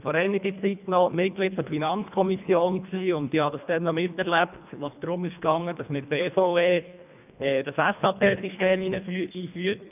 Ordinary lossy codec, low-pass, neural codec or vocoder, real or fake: none; 3.6 kHz; codec, 24 kHz, 1.5 kbps, HILCodec; fake